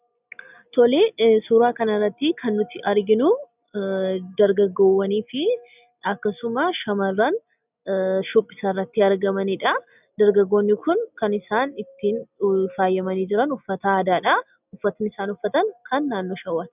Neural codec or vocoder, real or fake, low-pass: none; real; 3.6 kHz